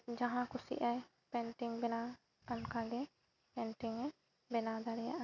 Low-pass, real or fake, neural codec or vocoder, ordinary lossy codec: 7.2 kHz; real; none; none